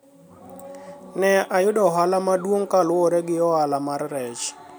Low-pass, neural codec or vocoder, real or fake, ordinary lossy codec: none; none; real; none